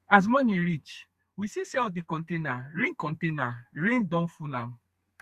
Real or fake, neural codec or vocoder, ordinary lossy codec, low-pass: fake; codec, 32 kHz, 1.9 kbps, SNAC; Opus, 64 kbps; 14.4 kHz